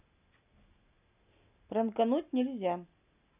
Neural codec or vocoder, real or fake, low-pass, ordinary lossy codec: none; real; 3.6 kHz; none